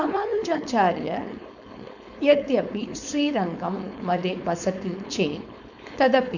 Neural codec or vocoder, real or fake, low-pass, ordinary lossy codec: codec, 16 kHz, 4.8 kbps, FACodec; fake; 7.2 kHz; none